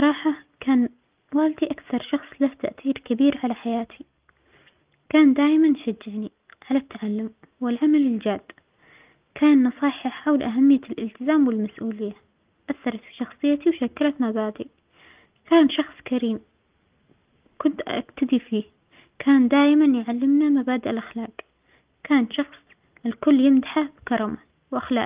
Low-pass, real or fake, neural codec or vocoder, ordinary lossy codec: 3.6 kHz; real; none; Opus, 32 kbps